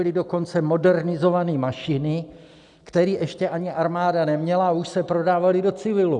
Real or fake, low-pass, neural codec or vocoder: real; 10.8 kHz; none